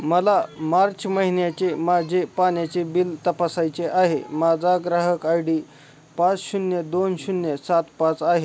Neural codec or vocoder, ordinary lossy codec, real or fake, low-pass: none; none; real; none